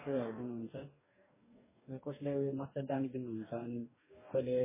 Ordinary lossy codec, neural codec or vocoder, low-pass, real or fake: MP3, 16 kbps; codec, 44.1 kHz, 2.6 kbps, DAC; 3.6 kHz; fake